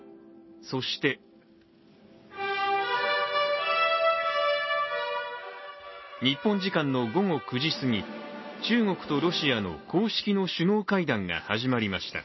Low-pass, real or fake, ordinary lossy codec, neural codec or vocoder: 7.2 kHz; real; MP3, 24 kbps; none